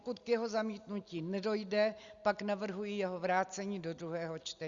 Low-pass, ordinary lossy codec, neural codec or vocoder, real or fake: 7.2 kHz; Opus, 64 kbps; none; real